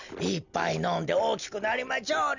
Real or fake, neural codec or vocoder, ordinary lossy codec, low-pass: real; none; none; 7.2 kHz